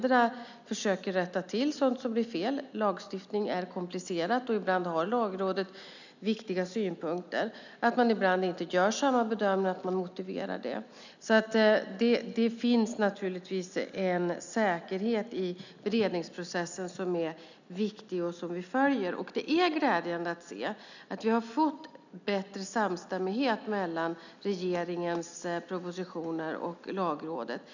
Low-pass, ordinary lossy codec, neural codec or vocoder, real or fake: 7.2 kHz; none; none; real